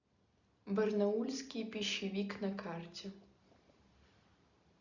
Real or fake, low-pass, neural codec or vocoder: real; 7.2 kHz; none